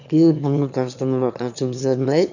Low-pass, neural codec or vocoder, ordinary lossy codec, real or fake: 7.2 kHz; autoencoder, 22.05 kHz, a latent of 192 numbers a frame, VITS, trained on one speaker; none; fake